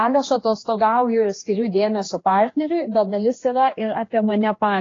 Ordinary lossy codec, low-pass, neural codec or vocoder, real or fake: AAC, 32 kbps; 7.2 kHz; codec, 16 kHz, 2 kbps, X-Codec, HuBERT features, trained on general audio; fake